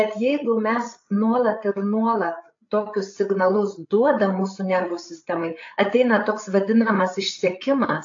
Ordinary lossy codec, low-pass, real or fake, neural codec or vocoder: AAC, 48 kbps; 7.2 kHz; fake; codec, 16 kHz, 8 kbps, FreqCodec, larger model